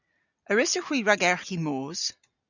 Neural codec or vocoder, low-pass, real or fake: none; 7.2 kHz; real